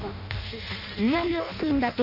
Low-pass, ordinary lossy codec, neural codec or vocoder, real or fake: 5.4 kHz; none; codec, 16 kHz in and 24 kHz out, 0.6 kbps, FireRedTTS-2 codec; fake